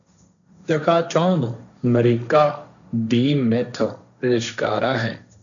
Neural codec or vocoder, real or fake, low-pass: codec, 16 kHz, 1.1 kbps, Voila-Tokenizer; fake; 7.2 kHz